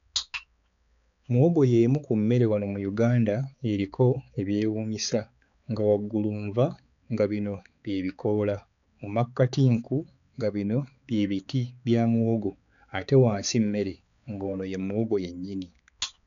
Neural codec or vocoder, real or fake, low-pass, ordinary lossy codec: codec, 16 kHz, 4 kbps, X-Codec, HuBERT features, trained on balanced general audio; fake; 7.2 kHz; none